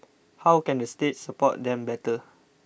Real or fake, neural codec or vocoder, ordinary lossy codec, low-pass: real; none; none; none